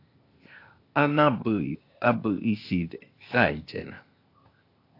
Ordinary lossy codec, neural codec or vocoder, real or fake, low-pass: AAC, 32 kbps; codec, 16 kHz, 0.8 kbps, ZipCodec; fake; 5.4 kHz